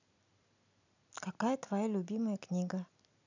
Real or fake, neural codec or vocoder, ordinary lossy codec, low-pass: fake; vocoder, 44.1 kHz, 128 mel bands every 256 samples, BigVGAN v2; none; 7.2 kHz